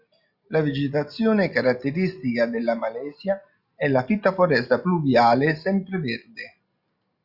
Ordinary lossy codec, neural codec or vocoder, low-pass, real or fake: Opus, 64 kbps; none; 5.4 kHz; real